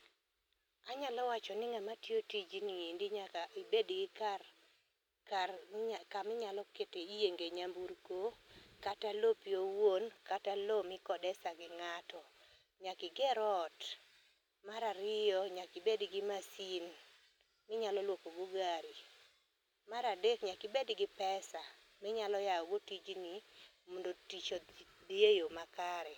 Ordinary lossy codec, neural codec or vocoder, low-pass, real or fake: none; none; 19.8 kHz; real